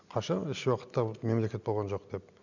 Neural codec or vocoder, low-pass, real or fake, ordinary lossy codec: none; 7.2 kHz; real; none